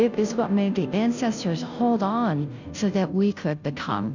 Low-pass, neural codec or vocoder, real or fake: 7.2 kHz; codec, 16 kHz, 0.5 kbps, FunCodec, trained on Chinese and English, 25 frames a second; fake